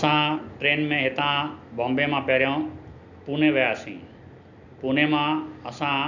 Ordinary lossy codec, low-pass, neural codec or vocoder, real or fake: none; 7.2 kHz; none; real